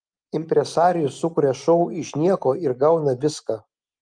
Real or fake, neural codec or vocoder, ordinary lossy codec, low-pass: real; none; Opus, 32 kbps; 9.9 kHz